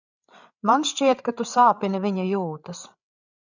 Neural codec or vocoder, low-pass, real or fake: codec, 16 kHz, 8 kbps, FreqCodec, larger model; 7.2 kHz; fake